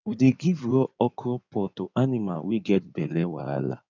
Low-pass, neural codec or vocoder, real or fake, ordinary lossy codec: 7.2 kHz; codec, 16 kHz in and 24 kHz out, 2.2 kbps, FireRedTTS-2 codec; fake; none